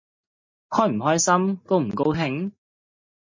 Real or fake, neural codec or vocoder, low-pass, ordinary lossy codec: real; none; 7.2 kHz; MP3, 32 kbps